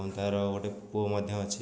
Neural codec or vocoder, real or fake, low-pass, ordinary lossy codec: none; real; none; none